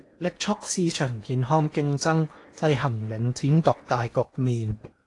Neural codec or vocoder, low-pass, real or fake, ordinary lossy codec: codec, 16 kHz in and 24 kHz out, 0.8 kbps, FocalCodec, streaming, 65536 codes; 10.8 kHz; fake; AAC, 48 kbps